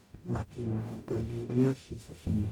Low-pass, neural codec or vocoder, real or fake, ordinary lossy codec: 19.8 kHz; codec, 44.1 kHz, 0.9 kbps, DAC; fake; none